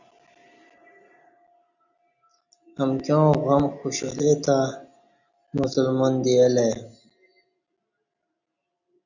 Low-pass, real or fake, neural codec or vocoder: 7.2 kHz; real; none